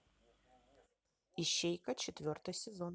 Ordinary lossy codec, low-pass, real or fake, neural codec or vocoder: none; none; real; none